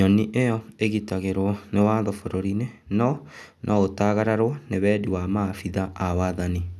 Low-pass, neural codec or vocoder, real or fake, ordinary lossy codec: none; none; real; none